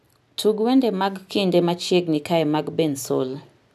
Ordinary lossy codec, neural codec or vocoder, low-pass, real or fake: none; none; 14.4 kHz; real